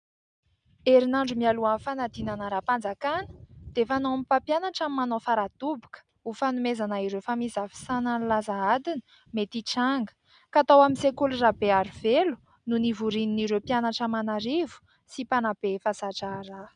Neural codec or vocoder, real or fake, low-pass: none; real; 9.9 kHz